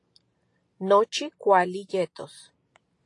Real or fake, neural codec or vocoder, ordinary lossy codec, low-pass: real; none; AAC, 48 kbps; 10.8 kHz